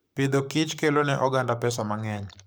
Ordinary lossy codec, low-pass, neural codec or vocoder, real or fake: none; none; codec, 44.1 kHz, 7.8 kbps, Pupu-Codec; fake